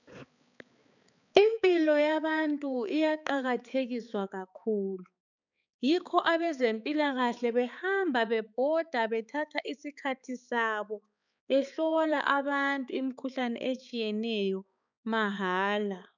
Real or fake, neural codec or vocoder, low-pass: fake; codec, 16 kHz, 4 kbps, X-Codec, HuBERT features, trained on balanced general audio; 7.2 kHz